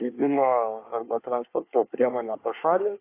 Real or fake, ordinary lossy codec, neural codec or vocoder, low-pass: fake; AAC, 24 kbps; codec, 24 kHz, 1 kbps, SNAC; 3.6 kHz